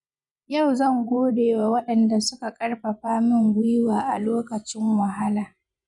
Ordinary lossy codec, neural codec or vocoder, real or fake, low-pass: none; vocoder, 24 kHz, 100 mel bands, Vocos; fake; 10.8 kHz